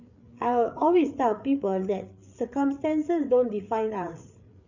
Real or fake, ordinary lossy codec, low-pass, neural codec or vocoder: fake; none; 7.2 kHz; codec, 16 kHz, 8 kbps, FreqCodec, larger model